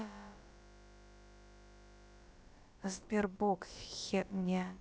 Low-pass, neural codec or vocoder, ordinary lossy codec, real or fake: none; codec, 16 kHz, about 1 kbps, DyCAST, with the encoder's durations; none; fake